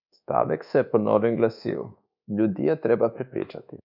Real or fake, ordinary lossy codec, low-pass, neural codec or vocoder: fake; none; 5.4 kHz; codec, 24 kHz, 1.2 kbps, DualCodec